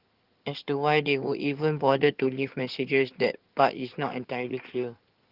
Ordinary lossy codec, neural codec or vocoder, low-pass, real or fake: Opus, 32 kbps; codec, 16 kHz in and 24 kHz out, 2.2 kbps, FireRedTTS-2 codec; 5.4 kHz; fake